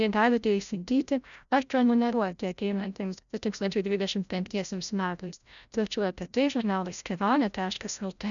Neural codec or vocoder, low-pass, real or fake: codec, 16 kHz, 0.5 kbps, FreqCodec, larger model; 7.2 kHz; fake